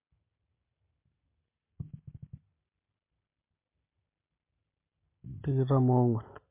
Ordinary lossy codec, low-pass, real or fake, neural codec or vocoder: MP3, 32 kbps; 3.6 kHz; real; none